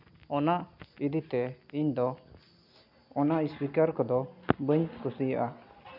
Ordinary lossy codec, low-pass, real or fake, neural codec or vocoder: none; 5.4 kHz; real; none